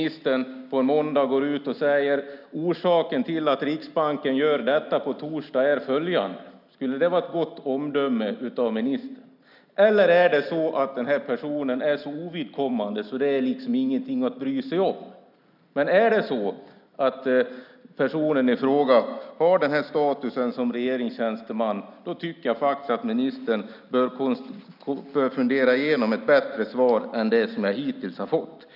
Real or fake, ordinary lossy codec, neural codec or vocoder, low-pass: real; none; none; 5.4 kHz